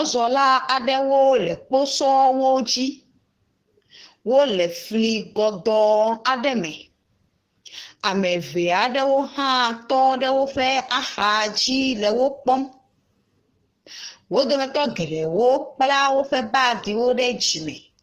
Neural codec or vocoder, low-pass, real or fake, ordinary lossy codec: codec, 44.1 kHz, 2.6 kbps, SNAC; 14.4 kHz; fake; Opus, 16 kbps